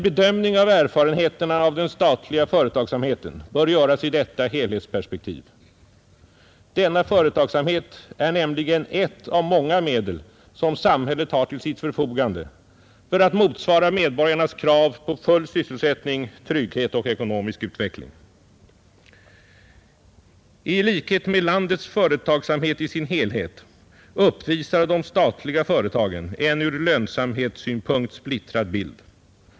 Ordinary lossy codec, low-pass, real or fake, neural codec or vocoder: none; none; real; none